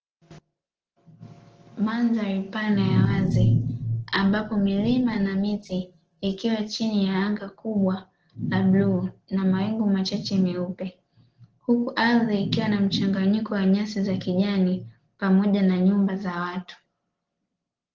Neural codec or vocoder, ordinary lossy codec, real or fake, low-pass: none; Opus, 16 kbps; real; 7.2 kHz